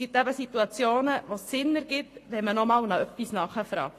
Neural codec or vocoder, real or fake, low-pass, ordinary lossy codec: codec, 44.1 kHz, 7.8 kbps, Pupu-Codec; fake; 14.4 kHz; AAC, 48 kbps